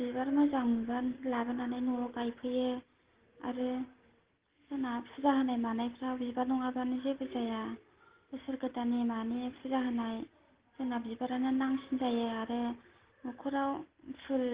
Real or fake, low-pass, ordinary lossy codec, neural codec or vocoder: real; 3.6 kHz; Opus, 16 kbps; none